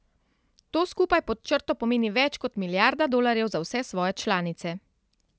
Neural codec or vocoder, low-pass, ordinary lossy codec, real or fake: none; none; none; real